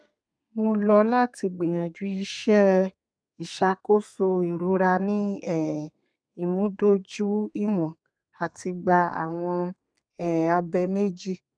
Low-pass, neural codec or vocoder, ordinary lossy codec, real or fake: 9.9 kHz; codec, 32 kHz, 1.9 kbps, SNAC; none; fake